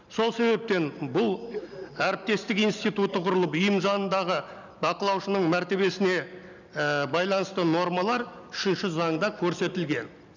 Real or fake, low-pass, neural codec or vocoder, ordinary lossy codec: real; 7.2 kHz; none; none